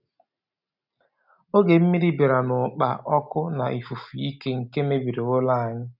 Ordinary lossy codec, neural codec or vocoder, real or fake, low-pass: none; none; real; 5.4 kHz